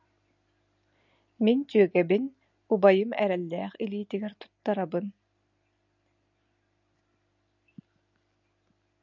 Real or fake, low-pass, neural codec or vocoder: real; 7.2 kHz; none